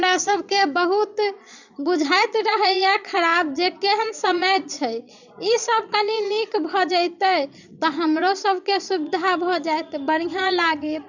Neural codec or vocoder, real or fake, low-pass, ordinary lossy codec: vocoder, 22.05 kHz, 80 mel bands, Vocos; fake; 7.2 kHz; none